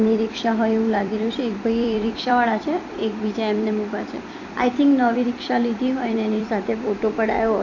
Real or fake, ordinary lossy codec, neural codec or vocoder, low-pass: fake; AAC, 48 kbps; vocoder, 44.1 kHz, 128 mel bands every 256 samples, BigVGAN v2; 7.2 kHz